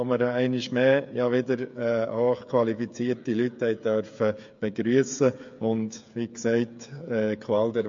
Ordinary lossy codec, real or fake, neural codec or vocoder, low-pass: MP3, 48 kbps; fake; codec, 16 kHz, 8 kbps, FreqCodec, smaller model; 7.2 kHz